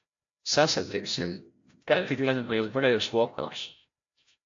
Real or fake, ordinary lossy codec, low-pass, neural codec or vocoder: fake; AAC, 48 kbps; 7.2 kHz; codec, 16 kHz, 0.5 kbps, FreqCodec, larger model